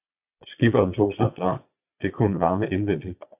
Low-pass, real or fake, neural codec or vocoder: 3.6 kHz; fake; vocoder, 22.05 kHz, 80 mel bands, Vocos